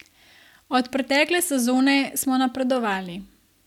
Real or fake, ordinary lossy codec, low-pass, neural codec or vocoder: real; none; 19.8 kHz; none